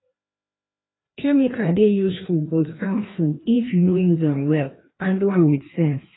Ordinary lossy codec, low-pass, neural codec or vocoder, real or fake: AAC, 16 kbps; 7.2 kHz; codec, 16 kHz, 1 kbps, FreqCodec, larger model; fake